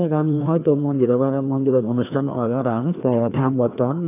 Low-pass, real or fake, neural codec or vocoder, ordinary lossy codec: 3.6 kHz; fake; codec, 16 kHz, 2 kbps, FreqCodec, larger model; AAC, 24 kbps